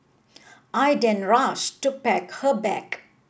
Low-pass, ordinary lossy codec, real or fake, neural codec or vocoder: none; none; real; none